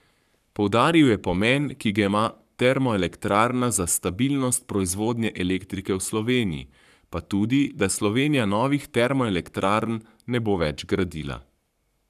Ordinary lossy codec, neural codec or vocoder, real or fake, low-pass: none; codec, 44.1 kHz, 7.8 kbps, Pupu-Codec; fake; 14.4 kHz